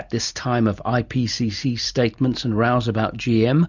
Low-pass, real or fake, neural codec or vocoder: 7.2 kHz; real; none